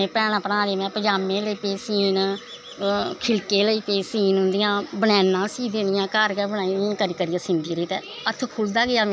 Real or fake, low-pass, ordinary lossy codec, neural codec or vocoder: real; none; none; none